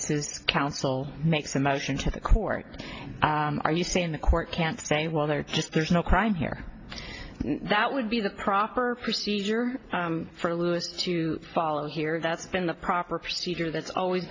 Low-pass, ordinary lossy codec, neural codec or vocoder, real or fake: 7.2 kHz; AAC, 32 kbps; none; real